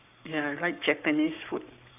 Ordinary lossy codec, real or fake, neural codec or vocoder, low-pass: none; fake; codec, 44.1 kHz, 7.8 kbps, Pupu-Codec; 3.6 kHz